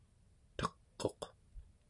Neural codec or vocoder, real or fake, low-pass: none; real; 10.8 kHz